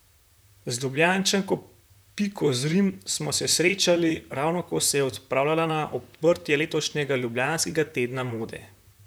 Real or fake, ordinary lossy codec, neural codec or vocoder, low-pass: fake; none; vocoder, 44.1 kHz, 128 mel bands, Pupu-Vocoder; none